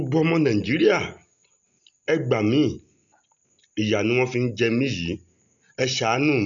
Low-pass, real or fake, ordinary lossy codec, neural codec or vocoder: 7.2 kHz; real; none; none